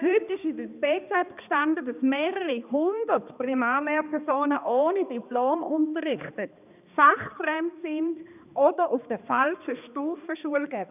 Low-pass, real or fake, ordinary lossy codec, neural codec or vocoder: 3.6 kHz; fake; none; codec, 16 kHz, 2 kbps, X-Codec, HuBERT features, trained on balanced general audio